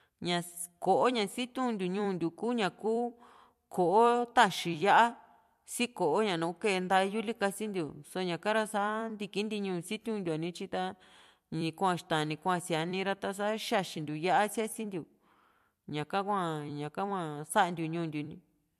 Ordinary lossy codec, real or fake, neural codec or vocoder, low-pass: MP3, 64 kbps; fake; vocoder, 44.1 kHz, 128 mel bands every 256 samples, BigVGAN v2; 14.4 kHz